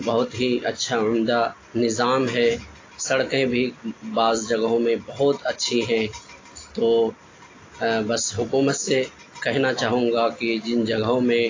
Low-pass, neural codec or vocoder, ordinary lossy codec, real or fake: 7.2 kHz; none; AAC, 32 kbps; real